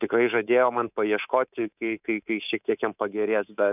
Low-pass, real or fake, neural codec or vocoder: 3.6 kHz; fake; codec, 24 kHz, 3.1 kbps, DualCodec